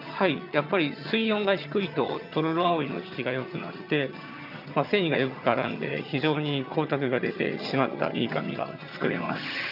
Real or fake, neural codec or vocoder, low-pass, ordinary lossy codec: fake; vocoder, 22.05 kHz, 80 mel bands, HiFi-GAN; 5.4 kHz; none